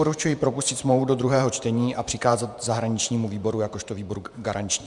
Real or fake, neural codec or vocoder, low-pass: fake; vocoder, 48 kHz, 128 mel bands, Vocos; 10.8 kHz